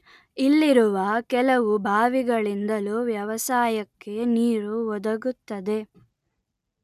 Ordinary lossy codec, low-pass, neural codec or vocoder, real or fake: none; 14.4 kHz; none; real